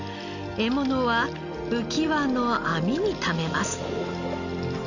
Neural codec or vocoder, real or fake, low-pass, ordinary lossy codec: none; real; 7.2 kHz; none